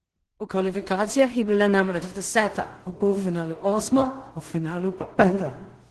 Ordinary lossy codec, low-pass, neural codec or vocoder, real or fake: Opus, 16 kbps; 10.8 kHz; codec, 16 kHz in and 24 kHz out, 0.4 kbps, LongCat-Audio-Codec, two codebook decoder; fake